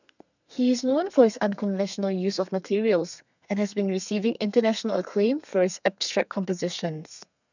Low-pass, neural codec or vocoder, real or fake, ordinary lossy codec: 7.2 kHz; codec, 32 kHz, 1.9 kbps, SNAC; fake; none